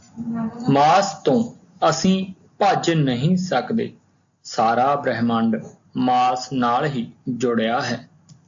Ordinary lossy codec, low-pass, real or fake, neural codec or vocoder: AAC, 64 kbps; 7.2 kHz; real; none